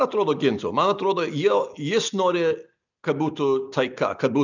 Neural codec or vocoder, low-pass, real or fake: none; 7.2 kHz; real